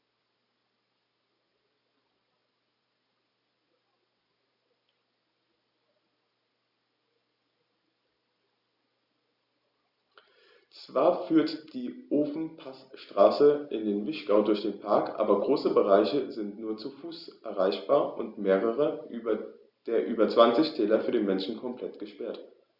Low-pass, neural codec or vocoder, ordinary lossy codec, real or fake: 5.4 kHz; none; Opus, 64 kbps; real